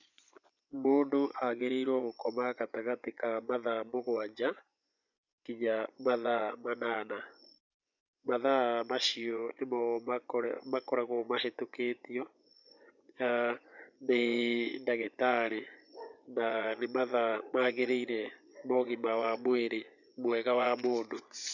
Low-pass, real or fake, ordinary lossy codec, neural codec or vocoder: 7.2 kHz; fake; none; vocoder, 24 kHz, 100 mel bands, Vocos